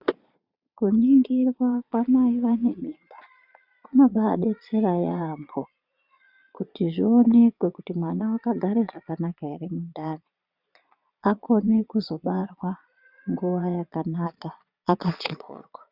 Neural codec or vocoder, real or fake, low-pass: vocoder, 22.05 kHz, 80 mel bands, Vocos; fake; 5.4 kHz